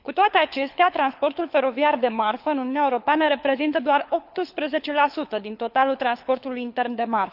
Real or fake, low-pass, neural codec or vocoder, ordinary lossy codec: fake; 5.4 kHz; codec, 24 kHz, 6 kbps, HILCodec; none